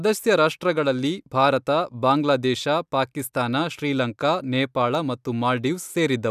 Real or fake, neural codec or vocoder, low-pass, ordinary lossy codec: real; none; 14.4 kHz; none